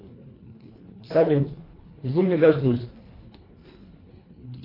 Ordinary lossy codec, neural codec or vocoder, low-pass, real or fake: AAC, 24 kbps; codec, 24 kHz, 1.5 kbps, HILCodec; 5.4 kHz; fake